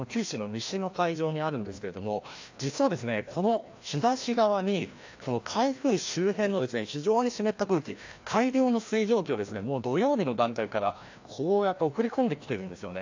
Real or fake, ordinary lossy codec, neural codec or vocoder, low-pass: fake; AAC, 48 kbps; codec, 16 kHz, 1 kbps, FreqCodec, larger model; 7.2 kHz